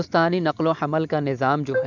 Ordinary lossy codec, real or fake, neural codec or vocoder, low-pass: none; real; none; 7.2 kHz